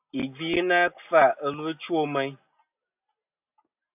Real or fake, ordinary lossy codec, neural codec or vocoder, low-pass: real; AAC, 32 kbps; none; 3.6 kHz